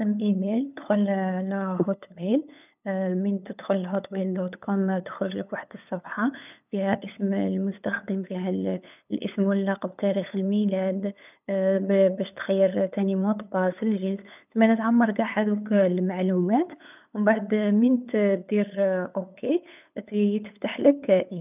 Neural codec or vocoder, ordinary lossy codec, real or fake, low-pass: codec, 16 kHz, 8 kbps, FunCodec, trained on LibriTTS, 25 frames a second; none; fake; 3.6 kHz